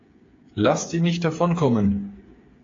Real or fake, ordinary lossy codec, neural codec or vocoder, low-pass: fake; AAC, 32 kbps; codec, 16 kHz, 8 kbps, FreqCodec, smaller model; 7.2 kHz